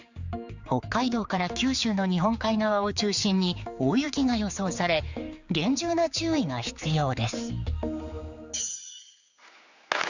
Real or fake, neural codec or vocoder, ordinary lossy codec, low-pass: fake; codec, 16 kHz, 4 kbps, X-Codec, HuBERT features, trained on general audio; none; 7.2 kHz